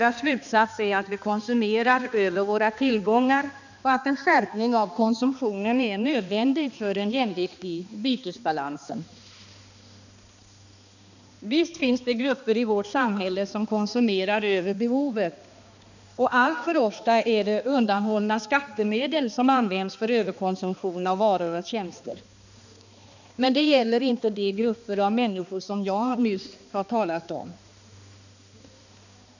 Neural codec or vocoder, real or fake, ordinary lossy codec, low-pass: codec, 16 kHz, 2 kbps, X-Codec, HuBERT features, trained on balanced general audio; fake; none; 7.2 kHz